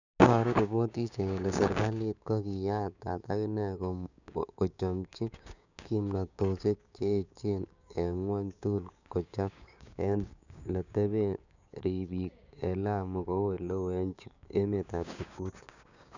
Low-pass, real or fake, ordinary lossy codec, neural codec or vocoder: 7.2 kHz; fake; none; codec, 16 kHz, 6 kbps, DAC